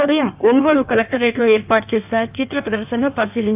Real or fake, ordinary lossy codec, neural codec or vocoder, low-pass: fake; AAC, 32 kbps; codec, 16 kHz in and 24 kHz out, 1.1 kbps, FireRedTTS-2 codec; 3.6 kHz